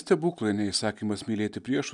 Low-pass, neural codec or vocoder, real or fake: 10.8 kHz; none; real